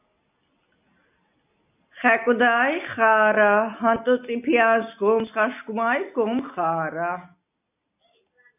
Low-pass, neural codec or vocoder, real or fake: 3.6 kHz; none; real